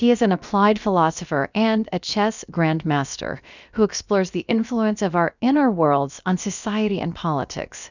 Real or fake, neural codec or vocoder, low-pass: fake; codec, 16 kHz, about 1 kbps, DyCAST, with the encoder's durations; 7.2 kHz